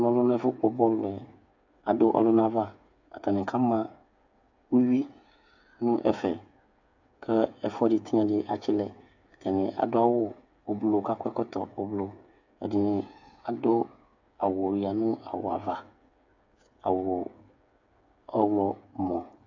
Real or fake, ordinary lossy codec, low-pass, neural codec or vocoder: fake; AAC, 48 kbps; 7.2 kHz; codec, 16 kHz, 8 kbps, FreqCodec, smaller model